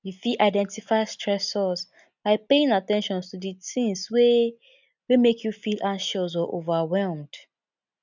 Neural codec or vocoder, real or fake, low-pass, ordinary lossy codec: none; real; 7.2 kHz; none